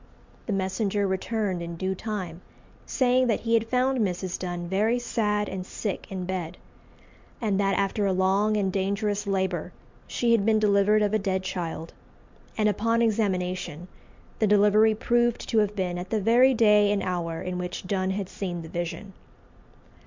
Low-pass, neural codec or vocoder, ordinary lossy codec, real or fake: 7.2 kHz; none; MP3, 64 kbps; real